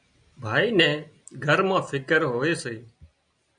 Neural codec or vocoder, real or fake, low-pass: none; real; 9.9 kHz